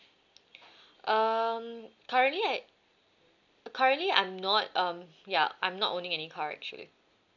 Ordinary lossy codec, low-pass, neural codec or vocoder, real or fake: none; 7.2 kHz; none; real